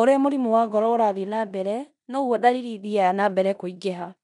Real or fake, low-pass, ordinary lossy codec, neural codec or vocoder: fake; 10.8 kHz; none; codec, 16 kHz in and 24 kHz out, 0.9 kbps, LongCat-Audio-Codec, four codebook decoder